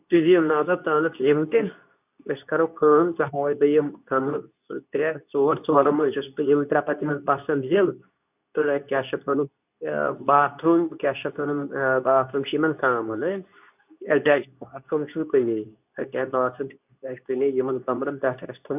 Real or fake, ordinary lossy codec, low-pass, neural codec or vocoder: fake; none; 3.6 kHz; codec, 24 kHz, 0.9 kbps, WavTokenizer, medium speech release version 2